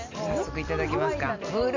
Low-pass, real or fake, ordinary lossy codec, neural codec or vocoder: 7.2 kHz; real; MP3, 48 kbps; none